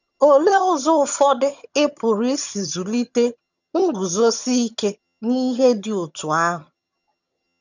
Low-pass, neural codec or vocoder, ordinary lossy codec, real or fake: 7.2 kHz; vocoder, 22.05 kHz, 80 mel bands, HiFi-GAN; none; fake